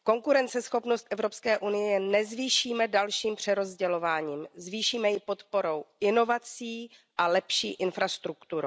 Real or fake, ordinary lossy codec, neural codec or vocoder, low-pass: real; none; none; none